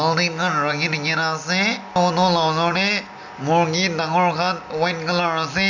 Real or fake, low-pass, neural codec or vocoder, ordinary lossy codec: real; 7.2 kHz; none; none